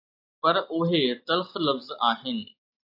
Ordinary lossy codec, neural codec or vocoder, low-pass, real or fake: AAC, 48 kbps; none; 5.4 kHz; real